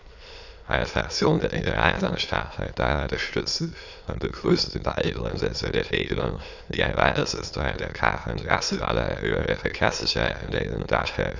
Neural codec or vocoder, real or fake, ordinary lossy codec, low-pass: autoencoder, 22.05 kHz, a latent of 192 numbers a frame, VITS, trained on many speakers; fake; none; 7.2 kHz